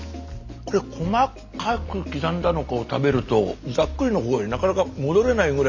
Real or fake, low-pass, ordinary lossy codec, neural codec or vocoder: real; 7.2 kHz; none; none